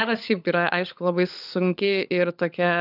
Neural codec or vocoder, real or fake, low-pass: codec, 16 kHz, 16 kbps, FunCodec, trained on LibriTTS, 50 frames a second; fake; 5.4 kHz